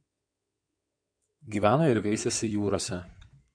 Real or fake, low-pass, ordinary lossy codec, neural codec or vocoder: fake; 9.9 kHz; MP3, 64 kbps; codec, 16 kHz in and 24 kHz out, 2.2 kbps, FireRedTTS-2 codec